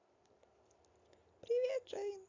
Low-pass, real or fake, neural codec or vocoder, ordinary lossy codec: 7.2 kHz; real; none; AAC, 32 kbps